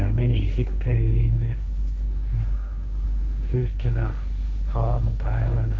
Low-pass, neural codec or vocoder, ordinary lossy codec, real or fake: none; codec, 16 kHz, 1.1 kbps, Voila-Tokenizer; none; fake